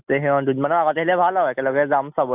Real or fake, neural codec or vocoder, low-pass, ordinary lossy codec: real; none; 3.6 kHz; AAC, 32 kbps